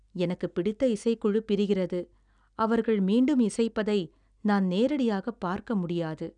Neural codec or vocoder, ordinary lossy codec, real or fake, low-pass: none; none; real; 9.9 kHz